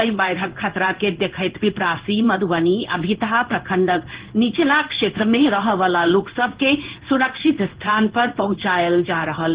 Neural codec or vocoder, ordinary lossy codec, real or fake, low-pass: codec, 16 kHz in and 24 kHz out, 1 kbps, XY-Tokenizer; Opus, 16 kbps; fake; 3.6 kHz